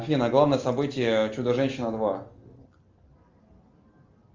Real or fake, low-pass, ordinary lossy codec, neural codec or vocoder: real; 7.2 kHz; Opus, 32 kbps; none